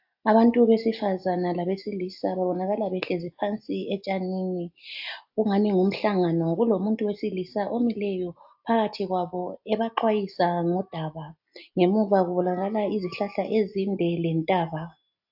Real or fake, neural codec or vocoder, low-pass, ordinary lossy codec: real; none; 5.4 kHz; AAC, 48 kbps